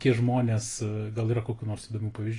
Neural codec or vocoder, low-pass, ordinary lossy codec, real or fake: none; 10.8 kHz; AAC, 32 kbps; real